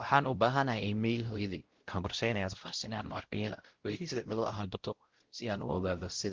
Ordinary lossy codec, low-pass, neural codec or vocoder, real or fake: Opus, 16 kbps; 7.2 kHz; codec, 16 kHz, 0.5 kbps, X-Codec, WavLM features, trained on Multilingual LibriSpeech; fake